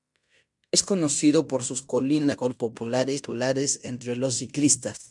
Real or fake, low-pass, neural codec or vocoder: fake; 10.8 kHz; codec, 16 kHz in and 24 kHz out, 0.9 kbps, LongCat-Audio-Codec, fine tuned four codebook decoder